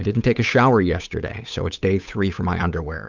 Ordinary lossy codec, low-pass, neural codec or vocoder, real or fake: Opus, 64 kbps; 7.2 kHz; codec, 16 kHz, 8 kbps, FunCodec, trained on Chinese and English, 25 frames a second; fake